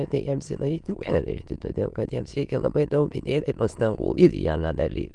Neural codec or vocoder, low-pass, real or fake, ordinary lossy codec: autoencoder, 22.05 kHz, a latent of 192 numbers a frame, VITS, trained on many speakers; 9.9 kHz; fake; Opus, 24 kbps